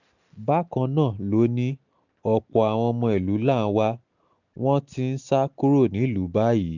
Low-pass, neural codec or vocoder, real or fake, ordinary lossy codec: 7.2 kHz; none; real; none